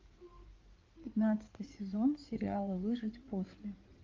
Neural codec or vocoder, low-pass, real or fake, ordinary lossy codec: codec, 16 kHz in and 24 kHz out, 2.2 kbps, FireRedTTS-2 codec; 7.2 kHz; fake; Opus, 32 kbps